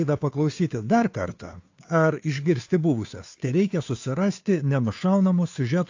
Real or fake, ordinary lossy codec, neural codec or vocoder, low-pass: fake; AAC, 48 kbps; codec, 16 kHz, 2 kbps, FunCodec, trained on Chinese and English, 25 frames a second; 7.2 kHz